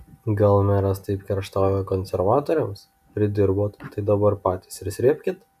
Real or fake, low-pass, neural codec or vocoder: real; 14.4 kHz; none